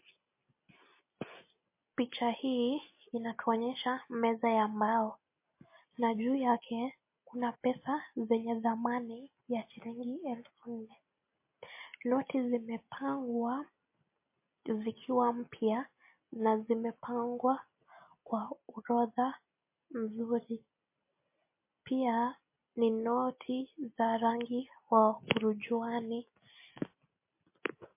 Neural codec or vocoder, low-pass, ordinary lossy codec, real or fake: none; 3.6 kHz; MP3, 24 kbps; real